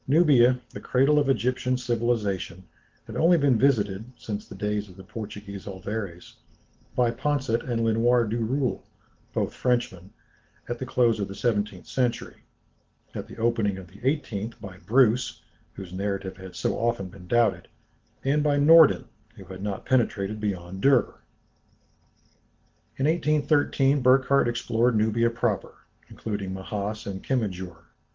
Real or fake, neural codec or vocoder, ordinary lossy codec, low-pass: real; none; Opus, 16 kbps; 7.2 kHz